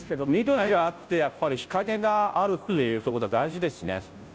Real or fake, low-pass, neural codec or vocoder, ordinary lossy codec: fake; none; codec, 16 kHz, 0.5 kbps, FunCodec, trained on Chinese and English, 25 frames a second; none